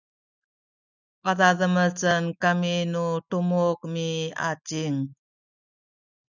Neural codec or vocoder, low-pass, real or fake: none; 7.2 kHz; real